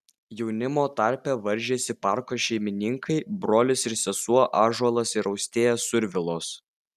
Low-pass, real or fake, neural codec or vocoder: 14.4 kHz; real; none